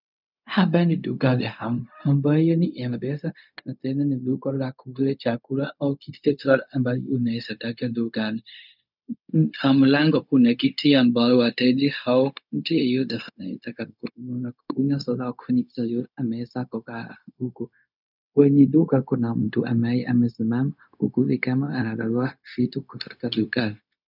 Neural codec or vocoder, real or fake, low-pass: codec, 16 kHz, 0.4 kbps, LongCat-Audio-Codec; fake; 5.4 kHz